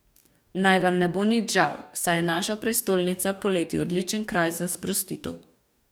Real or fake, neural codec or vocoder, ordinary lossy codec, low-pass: fake; codec, 44.1 kHz, 2.6 kbps, DAC; none; none